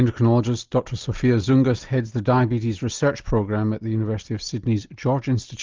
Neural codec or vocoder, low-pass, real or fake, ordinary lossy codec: none; 7.2 kHz; real; Opus, 32 kbps